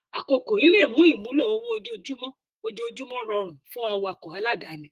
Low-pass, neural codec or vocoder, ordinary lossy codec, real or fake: 14.4 kHz; codec, 32 kHz, 1.9 kbps, SNAC; Opus, 24 kbps; fake